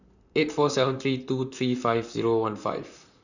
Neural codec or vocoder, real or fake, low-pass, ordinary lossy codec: vocoder, 44.1 kHz, 128 mel bands, Pupu-Vocoder; fake; 7.2 kHz; none